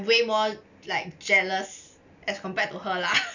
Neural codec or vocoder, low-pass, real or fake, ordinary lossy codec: none; 7.2 kHz; real; none